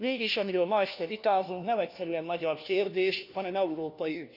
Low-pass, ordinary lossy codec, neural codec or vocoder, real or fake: 5.4 kHz; none; codec, 16 kHz, 1 kbps, FunCodec, trained on LibriTTS, 50 frames a second; fake